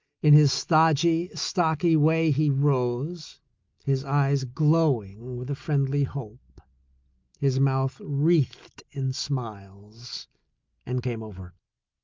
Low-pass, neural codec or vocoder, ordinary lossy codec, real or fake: 7.2 kHz; none; Opus, 24 kbps; real